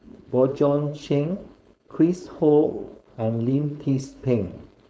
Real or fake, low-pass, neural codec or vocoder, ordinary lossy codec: fake; none; codec, 16 kHz, 4.8 kbps, FACodec; none